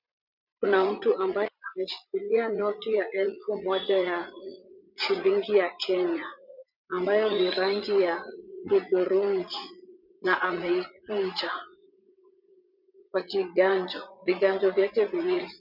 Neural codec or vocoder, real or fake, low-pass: vocoder, 44.1 kHz, 80 mel bands, Vocos; fake; 5.4 kHz